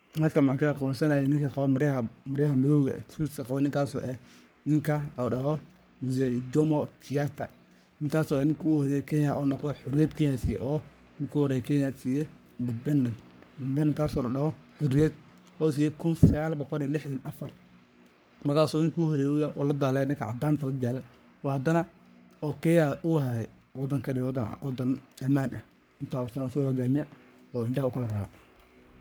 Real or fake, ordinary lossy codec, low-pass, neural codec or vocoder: fake; none; none; codec, 44.1 kHz, 3.4 kbps, Pupu-Codec